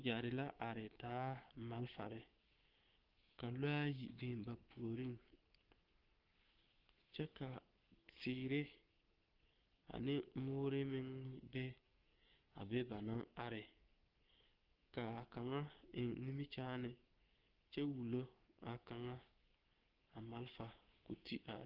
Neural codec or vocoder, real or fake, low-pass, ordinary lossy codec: codec, 16 kHz, 6 kbps, DAC; fake; 5.4 kHz; Opus, 16 kbps